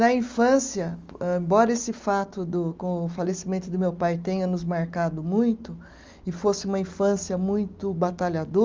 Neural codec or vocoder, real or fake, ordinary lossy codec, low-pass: none; real; Opus, 32 kbps; 7.2 kHz